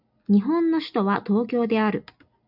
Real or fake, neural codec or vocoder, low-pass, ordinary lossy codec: real; none; 5.4 kHz; AAC, 48 kbps